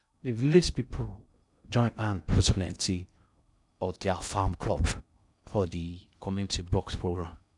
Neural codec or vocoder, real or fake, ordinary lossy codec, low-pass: codec, 16 kHz in and 24 kHz out, 0.6 kbps, FocalCodec, streaming, 2048 codes; fake; none; 10.8 kHz